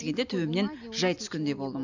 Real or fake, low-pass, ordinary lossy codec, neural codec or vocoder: real; 7.2 kHz; none; none